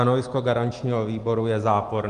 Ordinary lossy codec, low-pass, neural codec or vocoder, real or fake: Opus, 24 kbps; 10.8 kHz; none; real